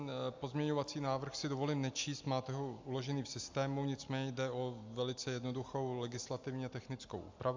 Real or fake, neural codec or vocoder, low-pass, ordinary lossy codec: real; none; 7.2 kHz; MP3, 64 kbps